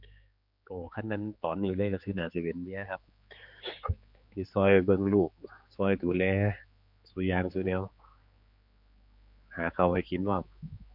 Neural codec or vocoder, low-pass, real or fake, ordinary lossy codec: codec, 16 kHz, 4 kbps, X-Codec, HuBERT features, trained on general audio; 5.4 kHz; fake; MP3, 48 kbps